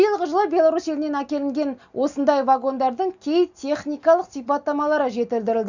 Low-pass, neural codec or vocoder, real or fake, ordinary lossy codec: 7.2 kHz; none; real; none